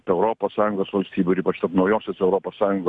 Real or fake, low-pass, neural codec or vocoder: real; 10.8 kHz; none